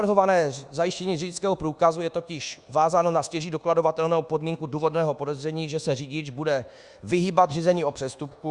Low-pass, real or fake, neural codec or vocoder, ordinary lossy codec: 10.8 kHz; fake; codec, 24 kHz, 1.2 kbps, DualCodec; Opus, 64 kbps